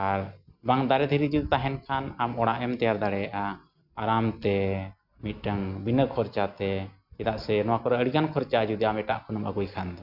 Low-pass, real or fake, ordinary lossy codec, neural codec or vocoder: 5.4 kHz; real; none; none